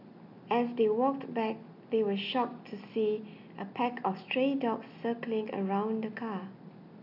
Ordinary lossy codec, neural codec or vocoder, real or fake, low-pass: none; none; real; 5.4 kHz